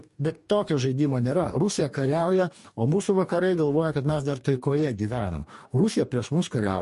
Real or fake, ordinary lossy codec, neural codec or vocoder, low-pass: fake; MP3, 48 kbps; codec, 44.1 kHz, 2.6 kbps, DAC; 14.4 kHz